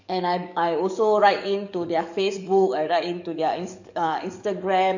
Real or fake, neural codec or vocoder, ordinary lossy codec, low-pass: fake; codec, 44.1 kHz, 7.8 kbps, DAC; none; 7.2 kHz